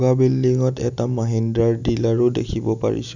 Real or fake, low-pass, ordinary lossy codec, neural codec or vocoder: real; 7.2 kHz; none; none